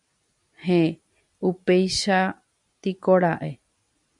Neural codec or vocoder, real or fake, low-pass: none; real; 10.8 kHz